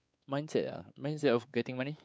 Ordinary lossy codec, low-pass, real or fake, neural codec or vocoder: none; none; fake; codec, 16 kHz, 4 kbps, X-Codec, WavLM features, trained on Multilingual LibriSpeech